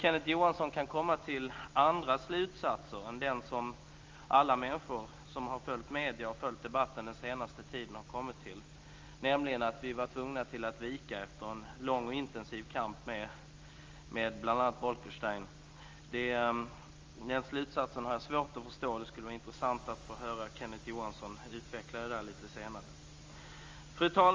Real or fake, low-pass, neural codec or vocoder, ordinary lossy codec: real; 7.2 kHz; none; Opus, 32 kbps